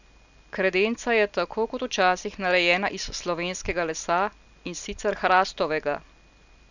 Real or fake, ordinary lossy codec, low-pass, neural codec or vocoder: real; none; 7.2 kHz; none